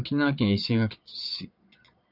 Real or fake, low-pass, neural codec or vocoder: fake; 5.4 kHz; vocoder, 22.05 kHz, 80 mel bands, Vocos